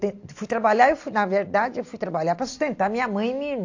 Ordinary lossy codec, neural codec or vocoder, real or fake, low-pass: AAC, 48 kbps; none; real; 7.2 kHz